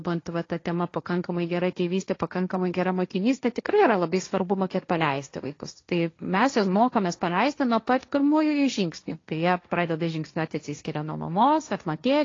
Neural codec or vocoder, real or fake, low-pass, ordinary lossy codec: codec, 16 kHz, 1.1 kbps, Voila-Tokenizer; fake; 7.2 kHz; AAC, 32 kbps